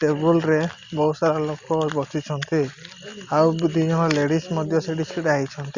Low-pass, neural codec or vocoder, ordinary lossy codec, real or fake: 7.2 kHz; none; Opus, 64 kbps; real